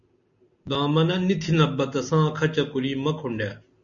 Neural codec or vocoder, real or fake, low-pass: none; real; 7.2 kHz